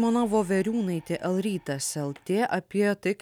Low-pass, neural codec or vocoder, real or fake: 19.8 kHz; none; real